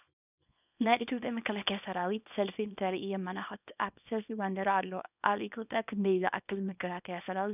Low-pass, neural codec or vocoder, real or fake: 3.6 kHz; codec, 24 kHz, 0.9 kbps, WavTokenizer, small release; fake